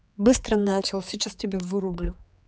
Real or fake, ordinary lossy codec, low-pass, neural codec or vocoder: fake; none; none; codec, 16 kHz, 4 kbps, X-Codec, HuBERT features, trained on general audio